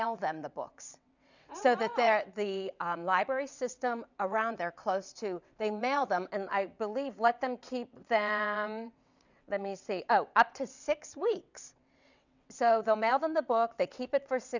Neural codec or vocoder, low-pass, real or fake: vocoder, 22.05 kHz, 80 mel bands, WaveNeXt; 7.2 kHz; fake